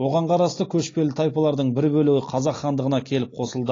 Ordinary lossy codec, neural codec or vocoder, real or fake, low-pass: AAC, 32 kbps; none; real; 7.2 kHz